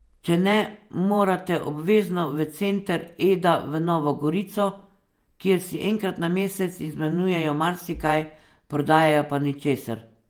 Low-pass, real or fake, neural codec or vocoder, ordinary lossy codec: 19.8 kHz; fake; vocoder, 48 kHz, 128 mel bands, Vocos; Opus, 32 kbps